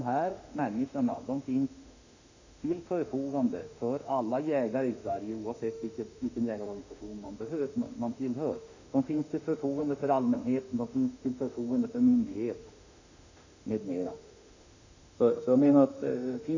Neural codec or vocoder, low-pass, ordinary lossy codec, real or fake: autoencoder, 48 kHz, 32 numbers a frame, DAC-VAE, trained on Japanese speech; 7.2 kHz; none; fake